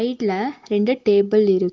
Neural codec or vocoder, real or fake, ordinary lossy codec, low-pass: none; real; Opus, 16 kbps; 7.2 kHz